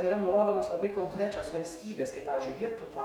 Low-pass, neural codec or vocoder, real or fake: 19.8 kHz; codec, 44.1 kHz, 2.6 kbps, DAC; fake